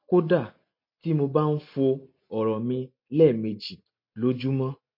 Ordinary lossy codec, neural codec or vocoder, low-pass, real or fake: MP3, 32 kbps; none; 5.4 kHz; real